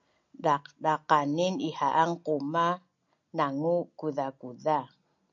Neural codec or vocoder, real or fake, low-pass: none; real; 7.2 kHz